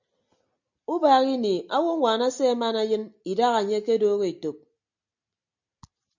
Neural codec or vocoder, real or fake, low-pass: none; real; 7.2 kHz